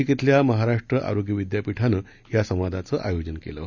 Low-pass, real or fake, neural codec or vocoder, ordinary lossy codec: 7.2 kHz; real; none; none